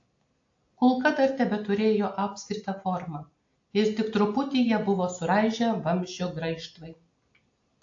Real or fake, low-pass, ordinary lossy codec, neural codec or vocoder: real; 7.2 kHz; AAC, 48 kbps; none